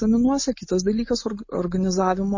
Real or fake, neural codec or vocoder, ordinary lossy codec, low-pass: real; none; MP3, 32 kbps; 7.2 kHz